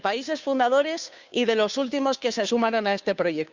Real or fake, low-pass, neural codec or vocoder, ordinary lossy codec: fake; 7.2 kHz; codec, 16 kHz, 2 kbps, FunCodec, trained on Chinese and English, 25 frames a second; Opus, 64 kbps